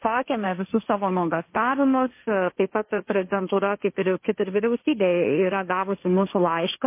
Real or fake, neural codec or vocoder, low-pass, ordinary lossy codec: fake; codec, 16 kHz, 1.1 kbps, Voila-Tokenizer; 3.6 kHz; MP3, 24 kbps